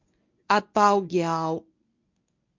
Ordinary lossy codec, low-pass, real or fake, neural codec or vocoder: MP3, 48 kbps; 7.2 kHz; fake; codec, 24 kHz, 0.9 kbps, WavTokenizer, medium speech release version 1